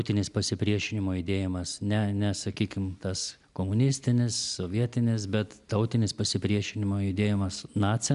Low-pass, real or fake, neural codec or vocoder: 10.8 kHz; real; none